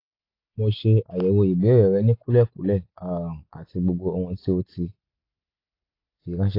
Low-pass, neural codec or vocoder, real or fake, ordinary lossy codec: 5.4 kHz; none; real; AAC, 32 kbps